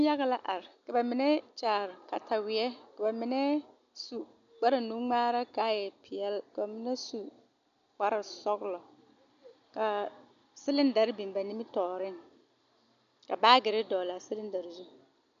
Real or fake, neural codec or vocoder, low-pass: real; none; 7.2 kHz